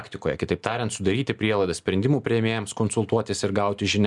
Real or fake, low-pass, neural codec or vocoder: real; 10.8 kHz; none